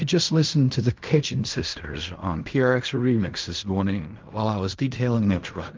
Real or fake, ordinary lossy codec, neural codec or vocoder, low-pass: fake; Opus, 32 kbps; codec, 16 kHz in and 24 kHz out, 0.4 kbps, LongCat-Audio-Codec, fine tuned four codebook decoder; 7.2 kHz